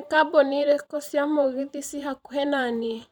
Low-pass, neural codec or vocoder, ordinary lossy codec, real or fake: 19.8 kHz; vocoder, 44.1 kHz, 128 mel bands every 512 samples, BigVGAN v2; none; fake